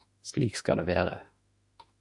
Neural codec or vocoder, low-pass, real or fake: autoencoder, 48 kHz, 32 numbers a frame, DAC-VAE, trained on Japanese speech; 10.8 kHz; fake